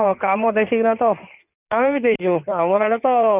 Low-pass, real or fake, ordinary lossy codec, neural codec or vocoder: 3.6 kHz; fake; none; codec, 16 kHz in and 24 kHz out, 2.2 kbps, FireRedTTS-2 codec